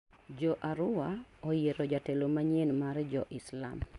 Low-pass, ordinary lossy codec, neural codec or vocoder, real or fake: 10.8 kHz; none; none; real